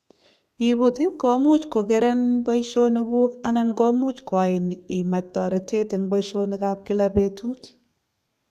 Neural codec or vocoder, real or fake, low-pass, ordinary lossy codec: codec, 32 kHz, 1.9 kbps, SNAC; fake; 14.4 kHz; none